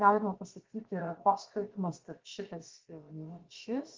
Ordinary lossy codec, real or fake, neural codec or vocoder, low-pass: Opus, 16 kbps; fake; codec, 16 kHz, about 1 kbps, DyCAST, with the encoder's durations; 7.2 kHz